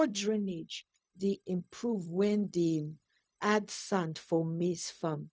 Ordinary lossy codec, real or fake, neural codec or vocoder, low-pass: none; fake; codec, 16 kHz, 0.4 kbps, LongCat-Audio-Codec; none